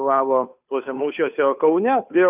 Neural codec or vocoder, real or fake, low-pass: codec, 16 kHz, 2 kbps, FunCodec, trained on Chinese and English, 25 frames a second; fake; 3.6 kHz